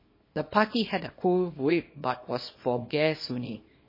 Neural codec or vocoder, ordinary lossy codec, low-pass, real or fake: codec, 24 kHz, 0.9 kbps, WavTokenizer, small release; MP3, 24 kbps; 5.4 kHz; fake